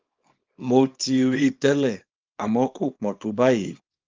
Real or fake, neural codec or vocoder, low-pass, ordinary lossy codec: fake; codec, 24 kHz, 0.9 kbps, WavTokenizer, small release; 7.2 kHz; Opus, 32 kbps